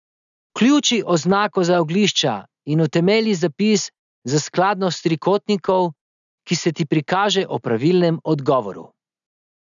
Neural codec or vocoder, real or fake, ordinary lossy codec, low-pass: none; real; none; 7.2 kHz